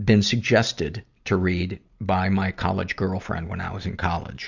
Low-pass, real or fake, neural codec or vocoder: 7.2 kHz; real; none